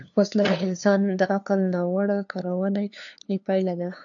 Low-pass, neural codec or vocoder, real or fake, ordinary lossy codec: 7.2 kHz; codec, 16 kHz, 2 kbps, FunCodec, trained on LibriTTS, 25 frames a second; fake; AAC, 64 kbps